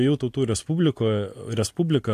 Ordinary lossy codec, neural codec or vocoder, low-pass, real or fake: AAC, 64 kbps; none; 14.4 kHz; real